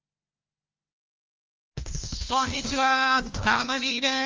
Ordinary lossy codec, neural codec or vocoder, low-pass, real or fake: Opus, 32 kbps; codec, 16 kHz, 1 kbps, FunCodec, trained on LibriTTS, 50 frames a second; 7.2 kHz; fake